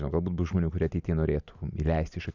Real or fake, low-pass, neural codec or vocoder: real; 7.2 kHz; none